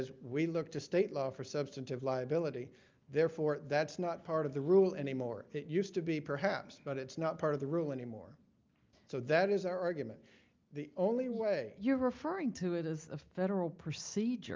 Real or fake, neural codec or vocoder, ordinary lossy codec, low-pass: real; none; Opus, 24 kbps; 7.2 kHz